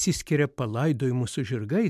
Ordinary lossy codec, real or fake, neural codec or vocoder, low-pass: MP3, 96 kbps; real; none; 14.4 kHz